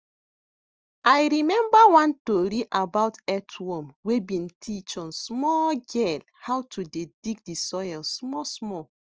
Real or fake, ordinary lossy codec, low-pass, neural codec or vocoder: real; Opus, 24 kbps; 7.2 kHz; none